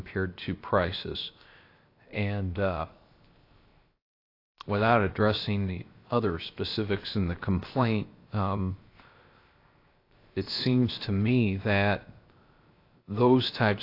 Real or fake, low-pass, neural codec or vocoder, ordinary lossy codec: fake; 5.4 kHz; codec, 16 kHz, 0.7 kbps, FocalCodec; AAC, 32 kbps